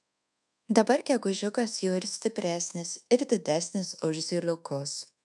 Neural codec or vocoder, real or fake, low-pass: codec, 24 kHz, 1.2 kbps, DualCodec; fake; 10.8 kHz